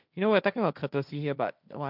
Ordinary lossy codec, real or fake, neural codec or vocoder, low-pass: none; fake; codec, 16 kHz, 1.1 kbps, Voila-Tokenizer; 5.4 kHz